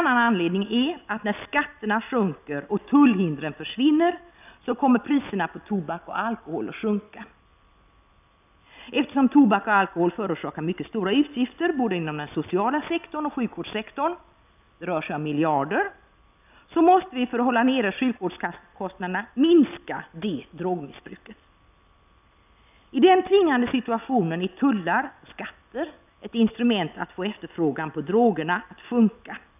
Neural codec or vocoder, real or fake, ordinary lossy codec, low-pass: none; real; none; 3.6 kHz